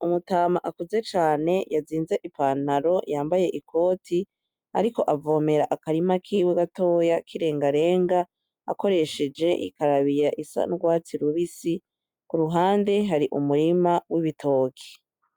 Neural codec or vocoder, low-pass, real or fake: none; 19.8 kHz; real